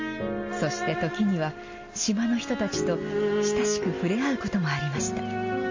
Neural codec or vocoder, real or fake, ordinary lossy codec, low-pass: none; real; MP3, 32 kbps; 7.2 kHz